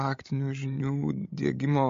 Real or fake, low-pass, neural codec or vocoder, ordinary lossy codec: fake; 7.2 kHz; codec, 16 kHz, 16 kbps, FreqCodec, larger model; MP3, 48 kbps